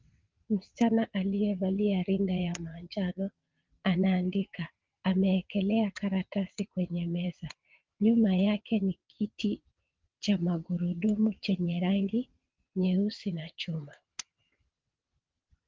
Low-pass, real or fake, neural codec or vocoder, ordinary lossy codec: 7.2 kHz; fake; vocoder, 22.05 kHz, 80 mel bands, WaveNeXt; Opus, 32 kbps